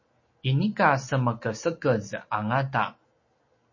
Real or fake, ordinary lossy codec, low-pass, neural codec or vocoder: real; MP3, 32 kbps; 7.2 kHz; none